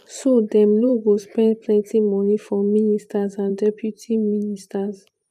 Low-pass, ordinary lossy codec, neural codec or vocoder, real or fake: 14.4 kHz; none; vocoder, 44.1 kHz, 128 mel bands every 512 samples, BigVGAN v2; fake